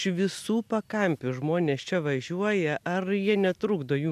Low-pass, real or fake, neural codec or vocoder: 14.4 kHz; real; none